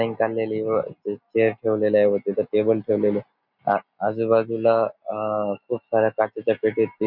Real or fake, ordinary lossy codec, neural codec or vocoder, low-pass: real; none; none; 5.4 kHz